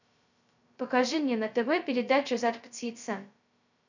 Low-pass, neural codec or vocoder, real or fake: 7.2 kHz; codec, 16 kHz, 0.2 kbps, FocalCodec; fake